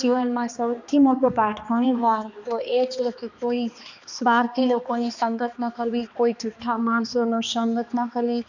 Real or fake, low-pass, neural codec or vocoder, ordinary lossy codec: fake; 7.2 kHz; codec, 16 kHz, 2 kbps, X-Codec, HuBERT features, trained on balanced general audio; none